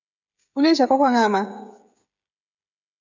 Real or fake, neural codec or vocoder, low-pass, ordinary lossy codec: fake; codec, 16 kHz, 16 kbps, FreqCodec, smaller model; 7.2 kHz; MP3, 64 kbps